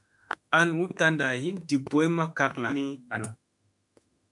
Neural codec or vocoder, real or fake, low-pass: autoencoder, 48 kHz, 32 numbers a frame, DAC-VAE, trained on Japanese speech; fake; 10.8 kHz